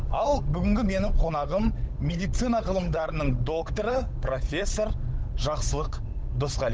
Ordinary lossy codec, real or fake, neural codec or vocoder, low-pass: none; fake; codec, 16 kHz, 8 kbps, FunCodec, trained on Chinese and English, 25 frames a second; none